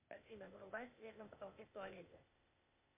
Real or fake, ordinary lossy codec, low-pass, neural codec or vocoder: fake; MP3, 32 kbps; 3.6 kHz; codec, 16 kHz, 0.8 kbps, ZipCodec